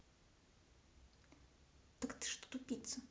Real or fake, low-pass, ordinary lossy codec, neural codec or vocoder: real; none; none; none